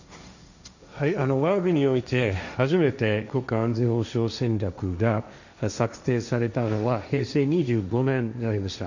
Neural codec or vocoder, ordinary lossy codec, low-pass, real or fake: codec, 16 kHz, 1.1 kbps, Voila-Tokenizer; none; 7.2 kHz; fake